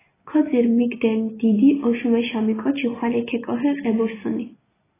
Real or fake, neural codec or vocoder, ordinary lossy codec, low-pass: real; none; AAC, 16 kbps; 3.6 kHz